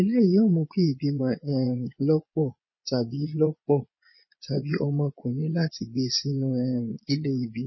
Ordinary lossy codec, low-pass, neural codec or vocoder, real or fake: MP3, 24 kbps; 7.2 kHz; vocoder, 22.05 kHz, 80 mel bands, Vocos; fake